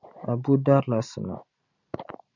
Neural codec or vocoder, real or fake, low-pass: none; real; 7.2 kHz